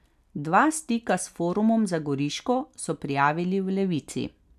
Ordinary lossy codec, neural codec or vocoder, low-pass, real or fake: none; none; 14.4 kHz; real